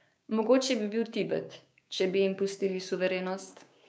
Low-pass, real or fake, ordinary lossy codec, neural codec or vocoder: none; fake; none; codec, 16 kHz, 6 kbps, DAC